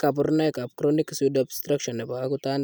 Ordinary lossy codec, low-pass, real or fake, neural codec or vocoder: none; none; real; none